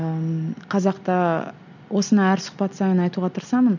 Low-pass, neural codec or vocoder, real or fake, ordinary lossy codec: 7.2 kHz; none; real; none